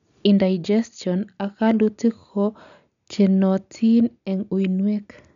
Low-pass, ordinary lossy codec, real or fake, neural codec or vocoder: 7.2 kHz; none; real; none